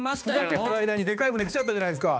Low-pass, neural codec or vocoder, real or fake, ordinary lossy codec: none; codec, 16 kHz, 2 kbps, X-Codec, HuBERT features, trained on balanced general audio; fake; none